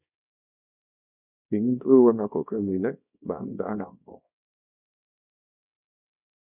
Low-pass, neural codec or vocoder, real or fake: 3.6 kHz; codec, 24 kHz, 0.9 kbps, WavTokenizer, small release; fake